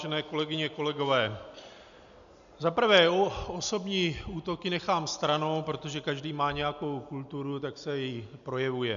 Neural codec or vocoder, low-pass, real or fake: none; 7.2 kHz; real